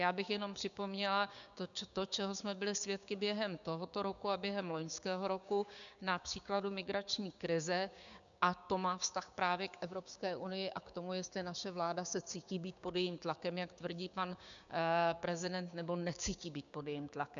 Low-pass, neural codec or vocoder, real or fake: 7.2 kHz; codec, 16 kHz, 6 kbps, DAC; fake